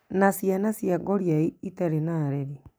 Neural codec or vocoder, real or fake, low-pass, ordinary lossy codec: none; real; none; none